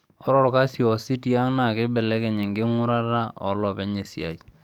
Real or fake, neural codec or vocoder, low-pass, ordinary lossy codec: fake; codec, 44.1 kHz, 7.8 kbps, DAC; 19.8 kHz; none